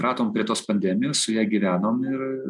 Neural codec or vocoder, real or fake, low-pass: none; real; 10.8 kHz